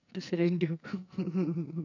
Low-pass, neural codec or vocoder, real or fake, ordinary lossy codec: 7.2 kHz; codec, 44.1 kHz, 2.6 kbps, SNAC; fake; none